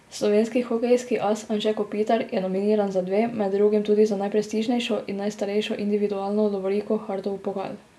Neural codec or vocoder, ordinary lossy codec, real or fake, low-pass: none; none; real; none